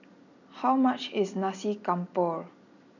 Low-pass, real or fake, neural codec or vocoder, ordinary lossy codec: 7.2 kHz; real; none; none